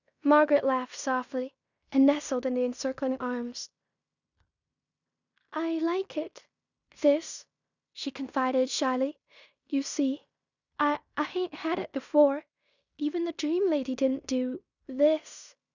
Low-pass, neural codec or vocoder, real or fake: 7.2 kHz; codec, 16 kHz in and 24 kHz out, 0.9 kbps, LongCat-Audio-Codec, fine tuned four codebook decoder; fake